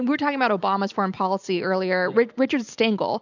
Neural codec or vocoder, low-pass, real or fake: none; 7.2 kHz; real